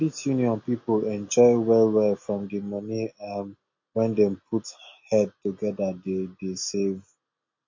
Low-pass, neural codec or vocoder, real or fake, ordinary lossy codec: 7.2 kHz; none; real; MP3, 32 kbps